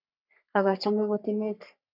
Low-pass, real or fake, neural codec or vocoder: 5.4 kHz; fake; codec, 44.1 kHz, 3.4 kbps, Pupu-Codec